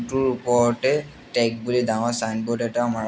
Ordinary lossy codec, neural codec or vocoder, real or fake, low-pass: none; none; real; none